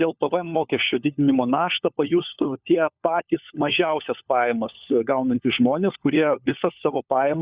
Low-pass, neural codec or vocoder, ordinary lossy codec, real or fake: 3.6 kHz; codec, 16 kHz, 4 kbps, FunCodec, trained on LibriTTS, 50 frames a second; Opus, 64 kbps; fake